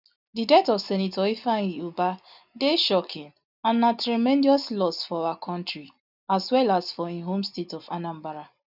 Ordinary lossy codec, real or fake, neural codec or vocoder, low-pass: none; real; none; 5.4 kHz